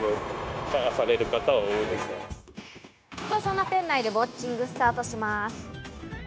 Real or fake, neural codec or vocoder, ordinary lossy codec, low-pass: fake; codec, 16 kHz, 0.9 kbps, LongCat-Audio-Codec; none; none